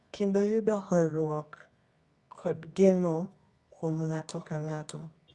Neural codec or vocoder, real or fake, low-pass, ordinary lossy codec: codec, 24 kHz, 0.9 kbps, WavTokenizer, medium music audio release; fake; 10.8 kHz; Opus, 64 kbps